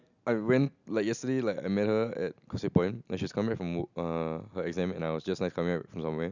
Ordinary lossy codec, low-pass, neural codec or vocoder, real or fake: none; 7.2 kHz; none; real